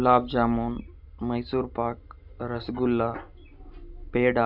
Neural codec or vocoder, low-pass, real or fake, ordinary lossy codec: none; 5.4 kHz; real; none